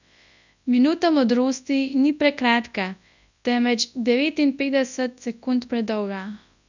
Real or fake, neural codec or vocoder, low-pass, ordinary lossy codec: fake; codec, 24 kHz, 0.9 kbps, WavTokenizer, large speech release; 7.2 kHz; none